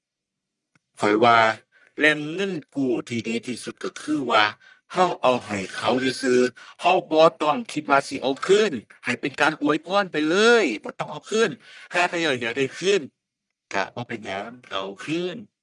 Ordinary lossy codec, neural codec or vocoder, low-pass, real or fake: none; codec, 44.1 kHz, 1.7 kbps, Pupu-Codec; 10.8 kHz; fake